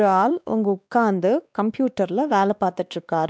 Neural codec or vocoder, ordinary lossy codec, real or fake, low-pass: codec, 16 kHz, 2 kbps, X-Codec, WavLM features, trained on Multilingual LibriSpeech; none; fake; none